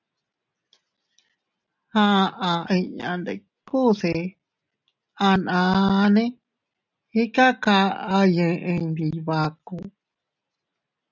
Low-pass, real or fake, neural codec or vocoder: 7.2 kHz; real; none